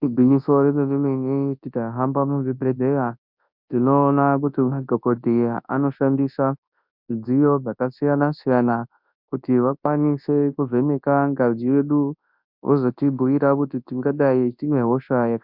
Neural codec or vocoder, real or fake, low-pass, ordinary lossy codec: codec, 24 kHz, 0.9 kbps, WavTokenizer, large speech release; fake; 5.4 kHz; MP3, 48 kbps